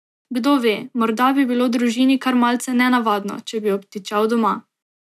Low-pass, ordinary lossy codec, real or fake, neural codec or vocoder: 14.4 kHz; none; real; none